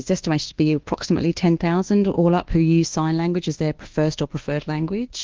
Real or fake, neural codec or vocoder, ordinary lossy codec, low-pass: fake; codec, 24 kHz, 1.2 kbps, DualCodec; Opus, 16 kbps; 7.2 kHz